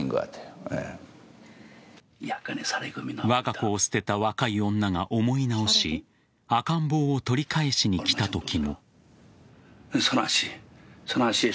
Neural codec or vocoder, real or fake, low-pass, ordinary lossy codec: none; real; none; none